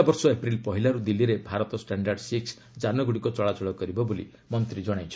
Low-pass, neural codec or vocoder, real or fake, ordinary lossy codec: none; none; real; none